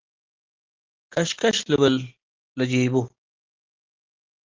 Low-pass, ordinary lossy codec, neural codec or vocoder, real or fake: 7.2 kHz; Opus, 16 kbps; none; real